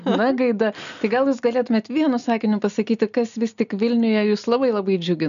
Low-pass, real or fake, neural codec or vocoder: 7.2 kHz; real; none